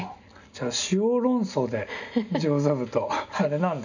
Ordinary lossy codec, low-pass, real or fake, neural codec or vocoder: none; 7.2 kHz; real; none